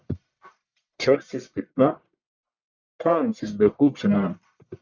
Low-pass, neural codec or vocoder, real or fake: 7.2 kHz; codec, 44.1 kHz, 1.7 kbps, Pupu-Codec; fake